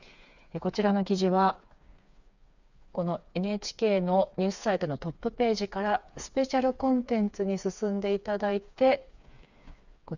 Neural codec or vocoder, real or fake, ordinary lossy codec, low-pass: codec, 16 kHz, 4 kbps, FreqCodec, smaller model; fake; none; 7.2 kHz